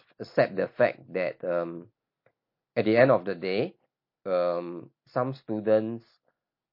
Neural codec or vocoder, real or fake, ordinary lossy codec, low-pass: none; real; MP3, 32 kbps; 5.4 kHz